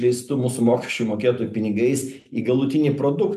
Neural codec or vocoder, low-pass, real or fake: none; 14.4 kHz; real